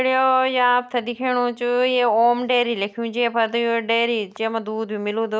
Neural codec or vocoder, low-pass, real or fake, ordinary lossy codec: none; none; real; none